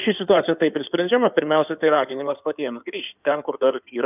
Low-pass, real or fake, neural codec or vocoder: 3.6 kHz; fake; codec, 16 kHz in and 24 kHz out, 2.2 kbps, FireRedTTS-2 codec